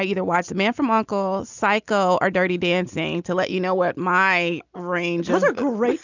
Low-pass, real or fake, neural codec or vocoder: 7.2 kHz; real; none